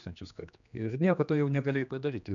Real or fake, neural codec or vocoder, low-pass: fake; codec, 16 kHz, 2 kbps, X-Codec, HuBERT features, trained on general audio; 7.2 kHz